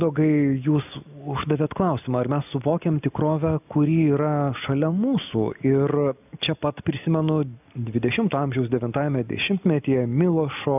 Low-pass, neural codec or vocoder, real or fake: 3.6 kHz; none; real